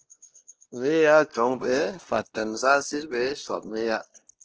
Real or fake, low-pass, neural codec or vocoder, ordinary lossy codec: fake; 7.2 kHz; codec, 16 kHz, 1 kbps, X-Codec, WavLM features, trained on Multilingual LibriSpeech; Opus, 16 kbps